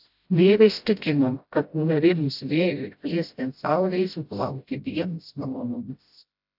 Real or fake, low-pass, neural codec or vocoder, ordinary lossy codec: fake; 5.4 kHz; codec, 16 kHz, 0.5 kbps, FreqCodec, smaller model; AAC, 48 kbps